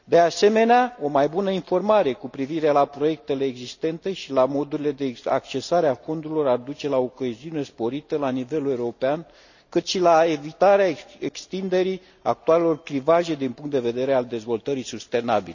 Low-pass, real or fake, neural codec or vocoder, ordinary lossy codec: 7.2 kHz; real; none; none